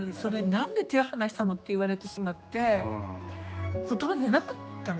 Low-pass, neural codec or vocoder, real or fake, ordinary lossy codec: none; codec, 16 kHz, 2 kbps, X-Codec, HuBERT features, trained on general audio; fake; none